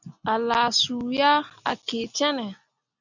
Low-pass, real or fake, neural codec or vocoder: 7.2 kHz; real; none